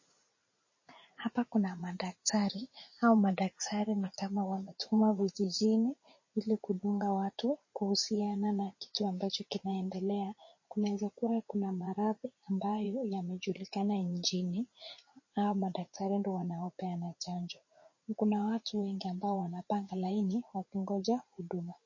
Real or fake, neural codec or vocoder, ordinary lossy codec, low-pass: fake; vocoder, 44.1 kHz, 80 mel bands, Vocos; MP3, 32 kbps; 7.2 kHz